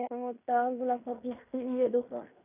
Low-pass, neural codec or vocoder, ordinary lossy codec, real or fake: 3.6 kHz; codec, 16 kHz in and 24 kHz out, 0.9 kbps, LongCat-Audio-Codec, fine tuned four codebook decoder; none; fake